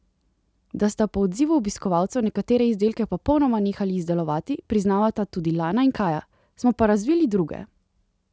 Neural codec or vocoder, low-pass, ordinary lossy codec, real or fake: none; none; none; real